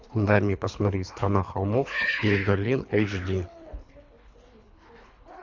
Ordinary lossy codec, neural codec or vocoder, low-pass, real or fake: MP3, 64 kbps; codec, 24 kHz, 3 kbps, HILCodec; 7.2 kHz; fake